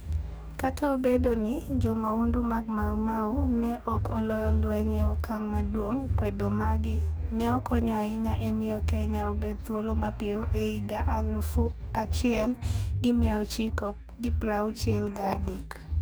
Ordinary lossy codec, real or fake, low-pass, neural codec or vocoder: none; fake; none; codec, 44.1 kHz, 2.6 kbps, DAC